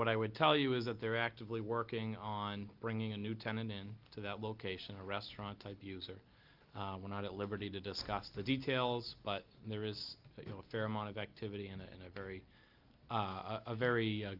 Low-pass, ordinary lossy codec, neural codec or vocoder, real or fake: 5.4 kHz; Opus, 32 kbps; none; real